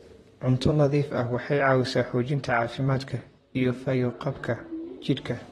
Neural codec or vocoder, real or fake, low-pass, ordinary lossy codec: vocoder, 44.1 kHz, 128 mel bands, Pupu-Vocoder; fake; 19.8 kHz; AAC, 32 kbps